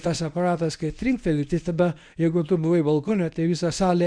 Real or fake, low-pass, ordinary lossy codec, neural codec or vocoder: fake; 9.9 kHz; Opus, 64 kbps; codec, 24 kHz, 0.9 kbps, WavTokenizer, medium speech release version 1